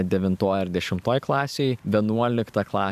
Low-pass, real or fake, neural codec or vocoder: 14.4 kHz; fake; vocoder, 44.1 kHz, 128 mel bands every 512 samples, BigVGAN v2